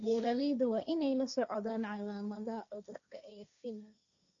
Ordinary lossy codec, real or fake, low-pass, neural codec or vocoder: MP3, 96 kbps; fake; 7.2 kHz; codec, 16 kHz, 1.1 kbps, Voila-Tokenizer